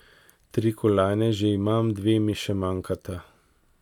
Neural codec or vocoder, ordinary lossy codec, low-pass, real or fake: none; none; 19.8 kHz; real